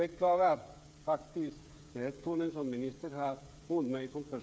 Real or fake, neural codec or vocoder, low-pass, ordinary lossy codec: fake; codec, 16 kHz, 8 kbps, FreqCodec, smaller model; none; none